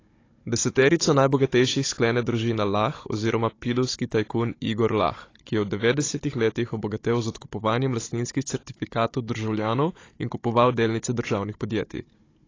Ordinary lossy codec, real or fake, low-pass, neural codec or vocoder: AAC, 32 kbps; fake; 7.2 kHz; codec, 16 kHz, 16 kbps, FunCodec, trained on Chinese and English, 50 frames a second